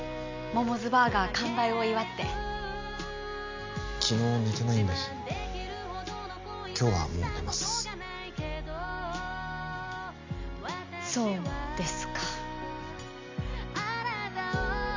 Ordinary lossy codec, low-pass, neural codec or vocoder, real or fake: MP3, 64 kbps; 7.2 kHz; none; real